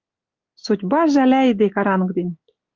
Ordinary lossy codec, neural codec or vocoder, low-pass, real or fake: Opus, 24 kbps; none; 7.2 kHz; real